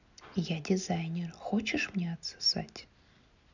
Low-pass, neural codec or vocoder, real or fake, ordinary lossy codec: 7.2 kHz; none; real; none